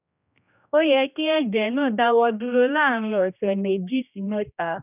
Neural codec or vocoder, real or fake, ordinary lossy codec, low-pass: codec, 16 kHz, 1 kbps, X-Codec, HuBERT features, trained on general audio; fake; none; 3.6 kHz